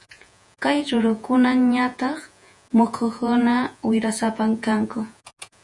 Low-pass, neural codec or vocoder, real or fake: 10.8 kHz; vocoder, 48 kHz, 128 mel bands, Vocos; fake